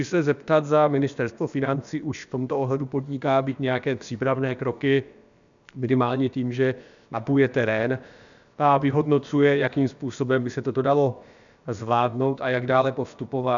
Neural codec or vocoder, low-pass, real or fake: codec, 16 kHz, about 1 kbps, DyCAST, with the encoder's durations; 7.2 kHz; fake